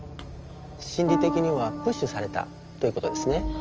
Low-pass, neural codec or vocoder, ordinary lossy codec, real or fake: 7.2 kHz; none; Opus, 24 kbps; real